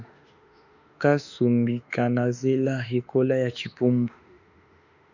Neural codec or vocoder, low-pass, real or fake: autoencoder, 48 kHz, 32 numbers a frame, DAC-VAE, trained on Japanese speech; 7.2 kHz; fake